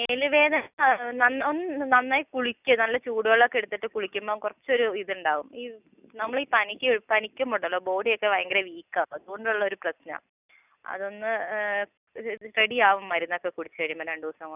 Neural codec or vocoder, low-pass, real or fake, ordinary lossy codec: none; 3.6 kHz; real; none